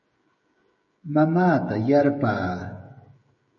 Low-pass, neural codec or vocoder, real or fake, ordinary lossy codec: 7.2 kHz; codec, 16 kHz, 16 kbps, FreqCodec, smaller model; fake; MP3, 32 kbps